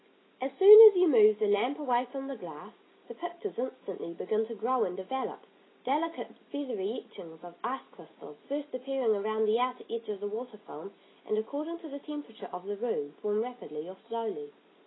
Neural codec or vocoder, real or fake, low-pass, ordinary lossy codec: none; real; 7.2 kHz; AAC, 16 kbps